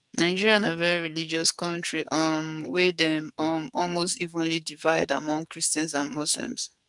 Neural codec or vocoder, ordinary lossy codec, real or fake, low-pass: codec, 44.1 kHz, 2.6 kbps, SNAC; none; fake; 10.8 kHz